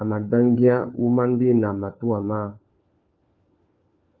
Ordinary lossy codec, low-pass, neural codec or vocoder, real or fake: Opus, 24 kbps; 7.2 kHz; codec, 16 kHz, 4 kbps, FunCodec, trained on LibriTTS, 50 frames a second; fake